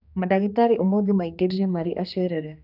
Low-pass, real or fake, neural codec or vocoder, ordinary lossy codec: 5.4 kHz; fake; codec, 16 kHz, 2 kbps, X-Codec, HuBERT features, trained on general audio; none